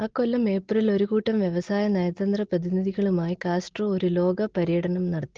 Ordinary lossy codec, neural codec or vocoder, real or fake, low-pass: Opus, 16 kbps; none; real; 7.2 kHz